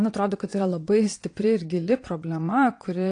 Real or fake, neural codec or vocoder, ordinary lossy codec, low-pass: fake; vocoder, 22.05 kHz, 80 mel bands, Vocos; AAC, 48 kbps; 9.9 kHz